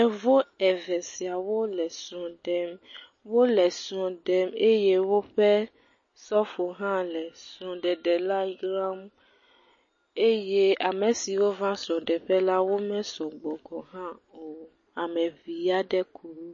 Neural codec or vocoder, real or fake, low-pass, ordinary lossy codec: none; real; 7.2 kHz; MP3, 32 kbps